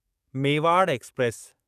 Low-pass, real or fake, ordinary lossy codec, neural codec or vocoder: 14.4 kHz; fake; none; codec, 44.1 kHz, 7.8 kbps, DAC